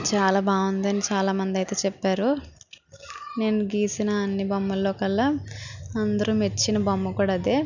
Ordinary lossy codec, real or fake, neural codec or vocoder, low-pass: none; real; none; 7.2 kHz